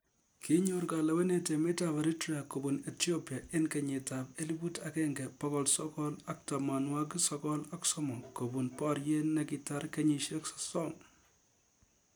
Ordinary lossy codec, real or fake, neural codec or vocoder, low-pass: none; real; none; none